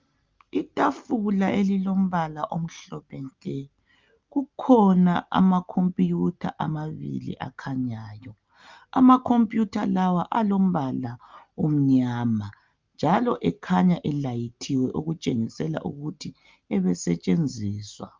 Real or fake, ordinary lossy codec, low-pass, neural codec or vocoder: real; Opus, 24 kbps; 7.2 kHz; none